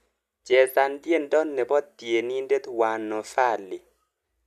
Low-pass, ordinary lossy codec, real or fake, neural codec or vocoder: 14.4 kHz; none; real; none